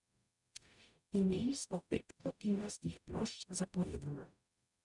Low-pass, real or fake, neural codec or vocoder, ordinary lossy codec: 10.8 kHz; fake; codec, 44.1 kHz, 0.9 kbps, DAC; none